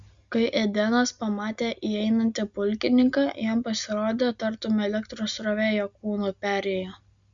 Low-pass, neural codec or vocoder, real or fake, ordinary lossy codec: 7.2 kHz; none; real; Opus, 64 kbps